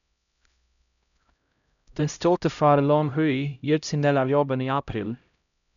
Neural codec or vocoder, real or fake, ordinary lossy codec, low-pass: codec, 16 kHz, 0.5 kbps, X-Codec, HuBERT features, trained on LibriSpeech; fake; MP3, 96 kbps; 7.2 kHz